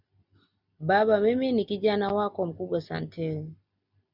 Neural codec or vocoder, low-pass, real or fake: none; 5.4 kHz; real